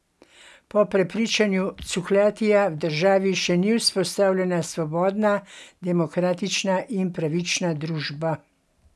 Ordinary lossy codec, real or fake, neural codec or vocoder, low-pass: none; real; none; none